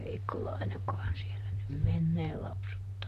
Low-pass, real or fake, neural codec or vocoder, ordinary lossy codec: 14.4 kHz; fake; vocoder, 44.1 kHz, 128 mel bands, Pupu-Vocoder; none